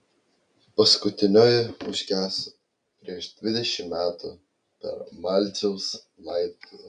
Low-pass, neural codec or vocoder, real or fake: 9.9 kHz; none; real